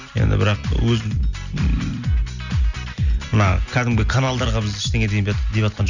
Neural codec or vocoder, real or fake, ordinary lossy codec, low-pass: none; real; none; 7.2 kHz